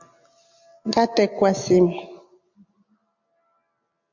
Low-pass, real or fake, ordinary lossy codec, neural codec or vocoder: 7.2 kHz; real; MP3, 48 kbps; none